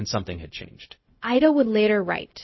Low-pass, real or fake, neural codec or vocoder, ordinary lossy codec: 7.2 kHz; fake; codec, 16 kHz, 0.4 kbps, LongCat-Audio-Codec; MP3, 24 kbps